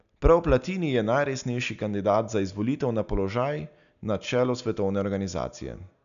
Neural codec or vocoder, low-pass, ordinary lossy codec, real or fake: none; 7.2 kHz; none; real